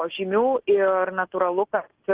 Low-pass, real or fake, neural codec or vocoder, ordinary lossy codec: 3.6 kHz; real; none; Opus, 16 kbps